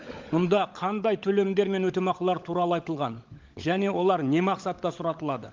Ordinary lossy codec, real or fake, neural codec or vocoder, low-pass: Opus, 32 kbps; fake; codec, 16 kHz, 16 kbps, FunCodec, trained on LibriTTS, 50 frames a second; 7.2 kHz